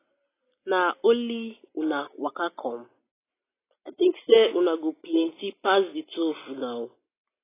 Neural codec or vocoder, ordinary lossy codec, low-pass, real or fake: none; AAC, 16 kbps; 3.6 kHz; real